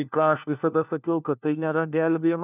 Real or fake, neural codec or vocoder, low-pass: fake; codec, 16 kHz, 1 kbps, FunCodec, trained on LibriTTS, 50 frames a second; 3.6 kHz